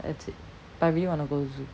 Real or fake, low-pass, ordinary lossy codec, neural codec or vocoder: real; none; none; none